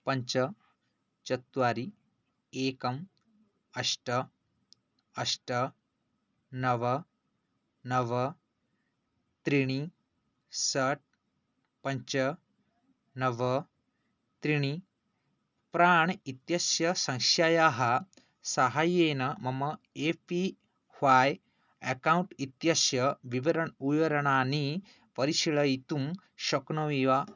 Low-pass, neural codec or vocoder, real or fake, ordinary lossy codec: 7.2 kHz; none; real; none